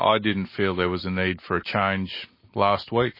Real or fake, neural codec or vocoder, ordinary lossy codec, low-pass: real; none; MP3, 24 kbps; 5.4 kHz